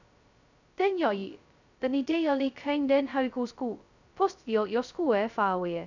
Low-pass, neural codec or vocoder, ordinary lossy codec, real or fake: 7.2 kHz; codec, 16 kHz, 0.2 kbps, FocalCodec; none; fake